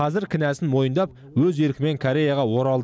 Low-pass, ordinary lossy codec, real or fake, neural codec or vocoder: none; none; real; none